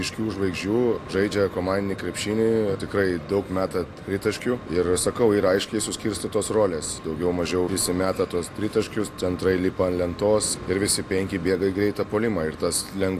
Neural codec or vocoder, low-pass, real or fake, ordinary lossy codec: none; 14.4 kHz; real; AAC, 64 kbps